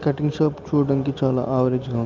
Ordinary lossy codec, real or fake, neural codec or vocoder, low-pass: Opus, 24 kbps; real; none; 7.2 kHz